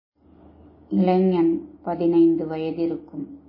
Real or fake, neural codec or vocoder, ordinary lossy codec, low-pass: real; none; MP3, 24 kbps; 5.4 kHz